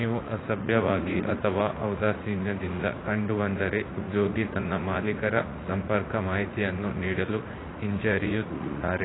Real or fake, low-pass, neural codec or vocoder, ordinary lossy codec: fake; 7.2 kHz; vocoder, 44.1 kHz, 80 mel bands, Vocos; AAC, 16 kbps